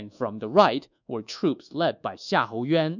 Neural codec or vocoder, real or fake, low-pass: codec, 24 kHz, 1.2 kbps, DualCodec; fake; 7.2 kHz